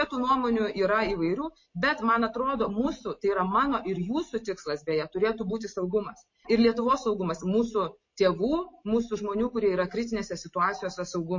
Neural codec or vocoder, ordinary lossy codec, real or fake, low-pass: none; MP3, 32 kbps; real; 7.2 kHz